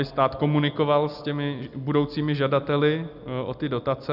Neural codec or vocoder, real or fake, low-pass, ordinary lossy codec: none; real; 5.4 kHz; Opus, 64 kbps